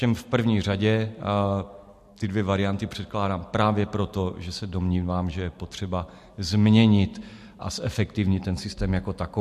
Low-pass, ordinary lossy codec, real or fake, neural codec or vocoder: 14.4 kHz; MP3, 64 kbps; real; none